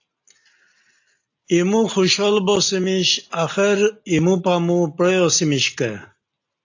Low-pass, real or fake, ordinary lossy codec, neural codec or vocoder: 7.2 kHz; real; AAC, 48 kbps; none